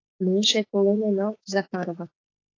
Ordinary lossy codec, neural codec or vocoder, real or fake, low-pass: AAC, 32 kbps; autoencoder, 48 kHz, 32 numbers a frame, DAC-VAE, trained on Japanese speech; fake; 7.2 kHz